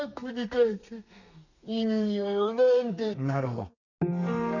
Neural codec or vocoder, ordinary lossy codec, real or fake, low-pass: codec, 32 kHz, 1.9 kbps, SNAC; none; fake; 7.2 kHz